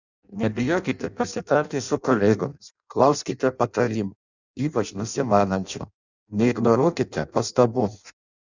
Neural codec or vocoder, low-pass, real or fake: codec, 16 kHz in and 24 kHz out, 0.6 kbps, FireRedTTS-2 codec; 7.2 kHz; fake